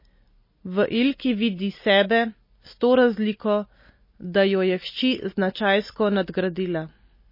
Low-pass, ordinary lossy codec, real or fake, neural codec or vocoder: 5.4 kHz; MP3, 24 kbps; real; none